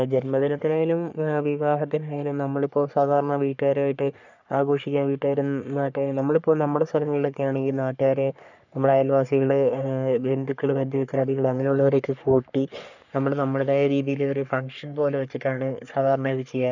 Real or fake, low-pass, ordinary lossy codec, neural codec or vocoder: fake; 7.2 kHz; none; codec, 44.1 kHz, 3.4 kbps, Pupu-Codec